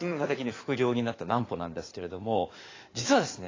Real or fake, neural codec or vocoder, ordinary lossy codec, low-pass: fake; codec, 16 kHz in and 24 kHz out, 2.2 kbps, FireRedTTS-2 codec; AAC, 32 kbps; 7.2 kHz